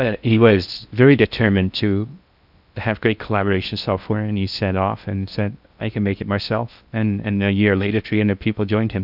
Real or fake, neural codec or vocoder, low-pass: fake; codec, 16 kHz in and 24 kHz out, 0.6 kbps, FocalCodec, streaming, 4096 codes; 5.4 kHz